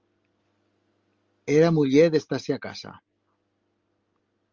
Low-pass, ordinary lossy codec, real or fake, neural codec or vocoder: 7.2 kHz; Opus, 32 kbps; real; none